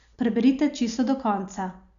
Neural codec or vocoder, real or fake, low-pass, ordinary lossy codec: none; real; 7.2 kHz; none